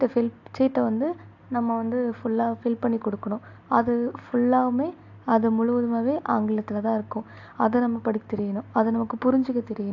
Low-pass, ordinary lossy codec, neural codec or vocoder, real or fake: 7.2 kHz; AAC, 48 kbps; none; real